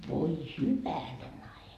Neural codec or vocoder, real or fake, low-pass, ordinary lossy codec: none; real; 14.4 kHz; none